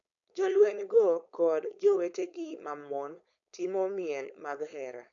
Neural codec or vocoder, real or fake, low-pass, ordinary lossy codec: codec, 16 kHz, 4.8 kbps, FACodec; fake; 7.2 kHz; none